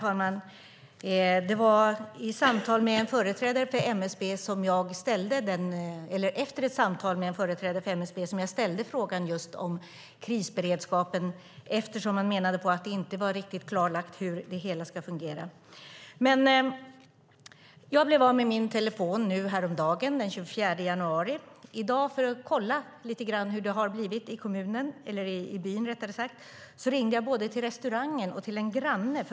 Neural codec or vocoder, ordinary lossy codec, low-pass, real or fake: none; none; none; real